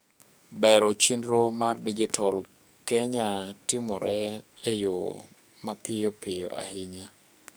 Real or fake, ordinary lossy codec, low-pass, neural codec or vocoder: fake; none; none; codec, 44.1 kHz, 2.6 kbps, SNAC